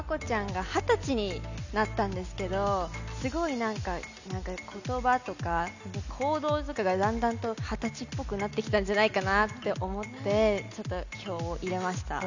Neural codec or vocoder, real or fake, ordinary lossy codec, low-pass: none; real; none; 7.2 kHz